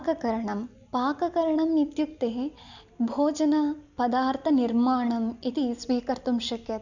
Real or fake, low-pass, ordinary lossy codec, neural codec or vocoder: real; 7.2 kHz; none; none